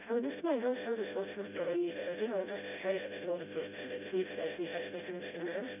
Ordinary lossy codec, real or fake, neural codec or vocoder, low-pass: none; fake; codec, 16 kHz, 0.5 kbps, FreqCodec, smaller model; 3.6 kHz